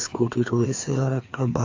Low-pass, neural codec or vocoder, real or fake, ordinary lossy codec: 7.2 kHz; codec, 16 kHz, 2 kbps, FreqCodec, larger model; fake; AAC, 48 kbps